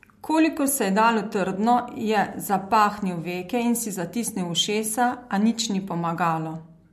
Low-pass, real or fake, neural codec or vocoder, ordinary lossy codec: 14.4 kHz; real; none; MP3, 64 kbps